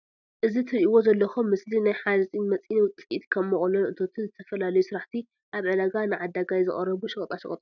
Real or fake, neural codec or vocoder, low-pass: real; none; 7.2 kHz